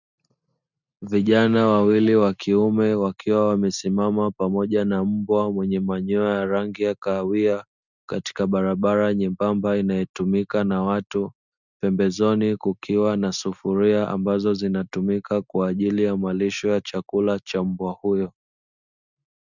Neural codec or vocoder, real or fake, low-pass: none; real; 7.2 kHz